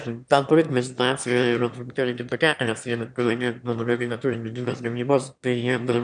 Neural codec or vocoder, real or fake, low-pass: autoencoder, 22.05 kHz, a latent of 192 numbers a frame, VITS, trained on one speaker; fake; 9.9 kHz